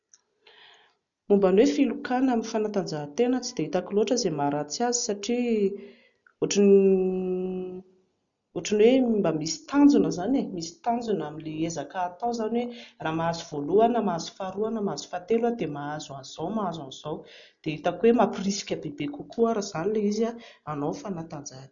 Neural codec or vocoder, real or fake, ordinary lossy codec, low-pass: none; real; none; 7.2 kHz